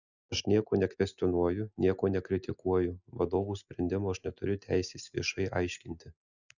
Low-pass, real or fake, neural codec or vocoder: 7.2 kHz; real; none